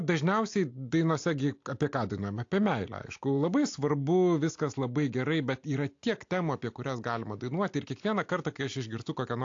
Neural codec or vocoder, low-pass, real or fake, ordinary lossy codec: none; 7.2 kHz; real; AAC, 48 kbps